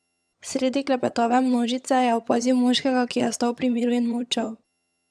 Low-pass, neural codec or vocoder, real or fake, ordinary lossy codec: none; vocoder, 22.05 kHz, 80 mel bands, HiFi-GAN; fake; none